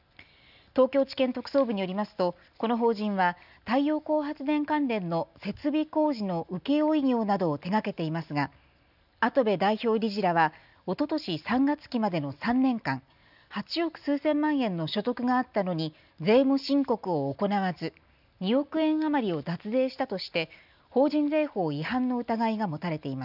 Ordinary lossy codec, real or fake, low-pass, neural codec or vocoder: none; real; 5.4 kHz; none